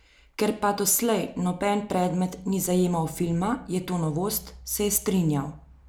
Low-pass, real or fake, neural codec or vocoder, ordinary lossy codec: none; real; none; none